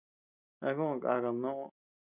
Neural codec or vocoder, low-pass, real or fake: none; 3.6 kHz; real